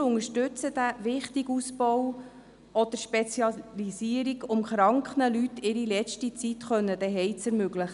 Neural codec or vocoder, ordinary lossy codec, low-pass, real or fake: none; none; 10.8 kHz; real